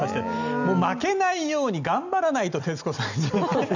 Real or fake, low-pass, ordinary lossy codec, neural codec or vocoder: real; 7.2 kHz; none; none